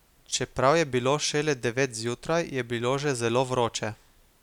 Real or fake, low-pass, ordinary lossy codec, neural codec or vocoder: real; 19.8 kHz; none; none